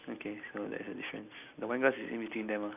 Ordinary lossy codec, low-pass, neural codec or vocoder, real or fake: none; 3.6 kHz; none; real